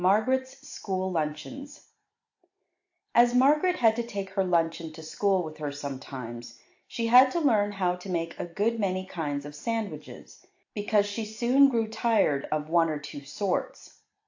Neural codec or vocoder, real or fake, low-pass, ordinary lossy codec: none; real; 7.2 kHz; AAC, 48 kbps